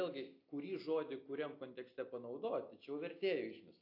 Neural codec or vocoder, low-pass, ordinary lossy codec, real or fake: none; 5.4 kHz; AAC, 32 kbps; real